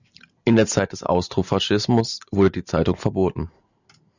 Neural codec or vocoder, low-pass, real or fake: none; 7.2 kHz; real